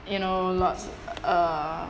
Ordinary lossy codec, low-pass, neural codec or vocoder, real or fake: none; none; none; real